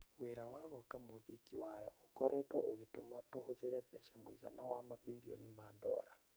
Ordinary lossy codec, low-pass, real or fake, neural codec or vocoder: none; none; fake; codec, 44.1 kHz, 2.6 kbps, SNAC